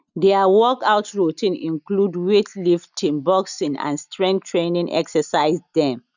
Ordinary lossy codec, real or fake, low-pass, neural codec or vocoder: none; real; 7.2 kHz; none